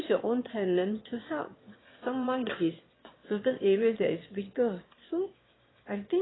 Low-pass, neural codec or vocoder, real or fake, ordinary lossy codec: 7.2 kHz; autoencoder, 22.05 kHz, a latent of 192 numbers a frame, VITS, trained on one speaker; fake; AAC, 16 kbps